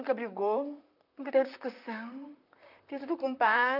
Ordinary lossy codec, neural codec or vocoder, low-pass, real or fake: none; vocoder, 44.1 kHz, 128 mel bands, Pupu-Vocoder; 5.4 kHz; fake